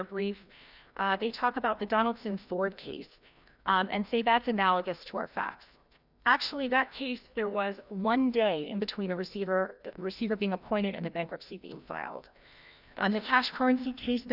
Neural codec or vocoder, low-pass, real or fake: codec, 16 kHz, 1 kbps, FreqCodec, larger model; 5.4 kHz; fake